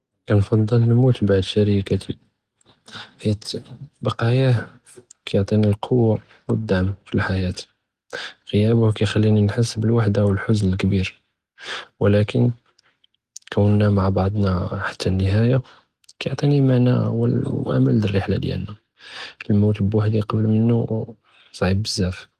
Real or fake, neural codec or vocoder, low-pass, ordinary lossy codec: real; none; 14.4 kHz; Opus, 24 kbps